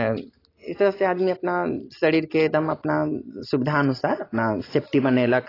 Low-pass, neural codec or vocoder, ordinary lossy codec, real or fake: 5.4 kHz; none; AAC, 24 kbps; real